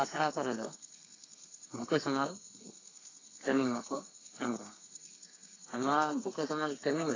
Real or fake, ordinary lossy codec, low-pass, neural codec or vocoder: fake; AAC, 32 kbps; 7.2 kHz; codec, 32 kHz, 1.9 kbps, SNAC